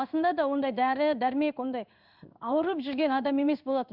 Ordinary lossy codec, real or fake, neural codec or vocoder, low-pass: none; fake; codec, 16 kHz in and 24 kHz out, 1 kbps, XY-Tokenizer; 5.4 kHz